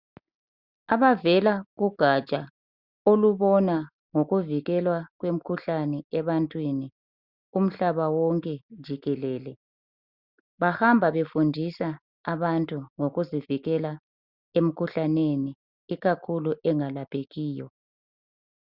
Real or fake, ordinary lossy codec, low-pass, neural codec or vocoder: real; Opus, 64 kbps; 5.4 kHz; none